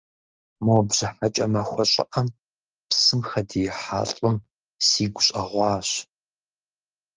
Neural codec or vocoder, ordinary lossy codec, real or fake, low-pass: none; Opus, 24 kbps; real; 7.2 kHz